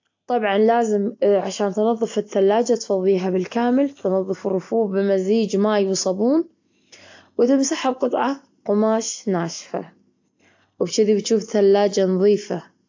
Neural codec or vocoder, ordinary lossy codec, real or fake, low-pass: none; AAC, 48 kbps; real; 7.2 kHz